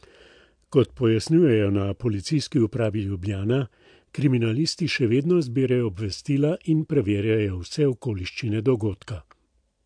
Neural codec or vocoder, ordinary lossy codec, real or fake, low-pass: none; MP3, 64 kbps; real; 9.9 kHz